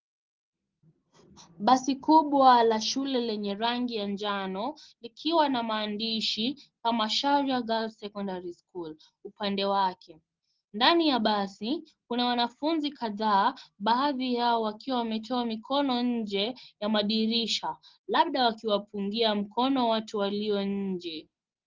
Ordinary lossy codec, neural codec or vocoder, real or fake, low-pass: Opus, 16 kbps; none; real; 7.2 kHz